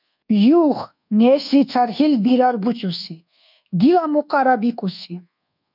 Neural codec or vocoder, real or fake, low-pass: codec, 24 kHz, 1.2 kbps, DualCodec; fake; 5.4 kHz